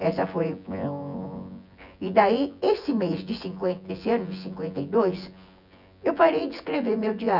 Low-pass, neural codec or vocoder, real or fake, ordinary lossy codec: 5.4 kHz; vocoder, 24 kHz, 100 mel bands, Vocos; fake; none